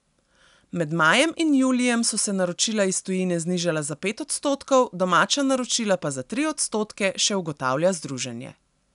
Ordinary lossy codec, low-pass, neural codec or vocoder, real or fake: none; 10.8 kHz; none; real